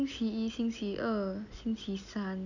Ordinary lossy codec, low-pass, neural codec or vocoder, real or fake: none; 7.2 kHz; none; real